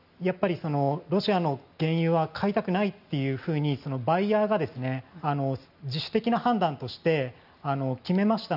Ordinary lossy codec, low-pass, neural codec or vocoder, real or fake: none; 5.4 kHz; none; real